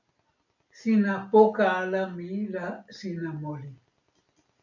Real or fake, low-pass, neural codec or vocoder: real; 7.2 kHz; none